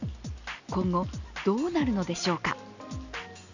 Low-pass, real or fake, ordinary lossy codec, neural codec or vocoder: 7.2 kHz; real; none; none